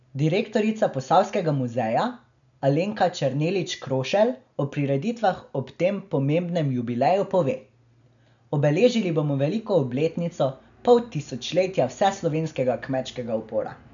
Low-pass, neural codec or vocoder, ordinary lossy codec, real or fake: 7.2 kHz; none; none; real